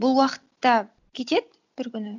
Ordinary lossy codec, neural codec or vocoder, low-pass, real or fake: none; none; 7.2 kHz; real